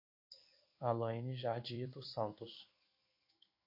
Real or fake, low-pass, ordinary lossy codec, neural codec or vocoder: fake; 5.4 kHz; MP3, 32 kbps; codec, 16 kHz in and 24 kHz out, 1 kbps, XY-Tokenizer